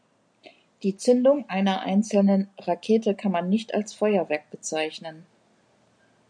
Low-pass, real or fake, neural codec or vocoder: 9.9 kHz; real; none